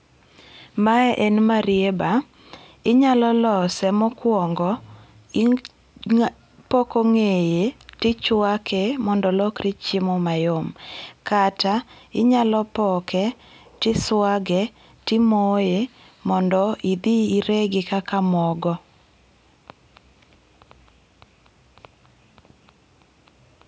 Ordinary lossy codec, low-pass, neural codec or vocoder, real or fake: none; none; none; real